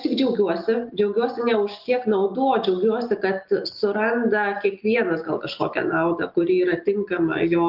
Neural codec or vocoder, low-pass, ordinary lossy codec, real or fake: none; 5.4 kHz; Opus, 32 kbps; real